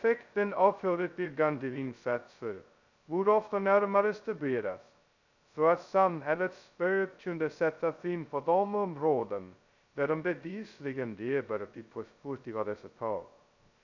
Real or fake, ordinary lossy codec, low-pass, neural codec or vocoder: fake; none; 7.2 kHz; codec, 16 kHz, 0.2 kbps, FocalCodec